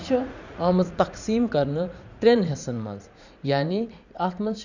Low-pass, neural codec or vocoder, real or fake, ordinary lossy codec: 7.2 kHz; vocoder, 44.1 kHz, 80 mel bands, Vocos; fake; none